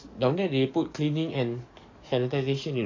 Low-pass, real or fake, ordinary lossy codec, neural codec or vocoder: 7.2 kHz; fake; AAC, 48 kbps; codec, 44.1 kHz, 7.8 kbps, DAC